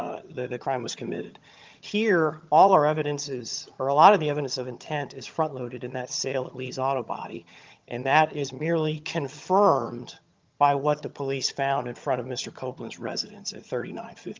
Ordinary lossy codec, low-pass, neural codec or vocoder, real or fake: Opus, 32 kbps; 7.2 kHz; vocoder, 22.05 kHz, 80 mel bands, HiFi-GAN; fake